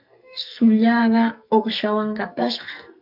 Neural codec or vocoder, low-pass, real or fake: codec, 44.1 kHz, 2.6 kbps, SNAC; 5.4 kHz; fake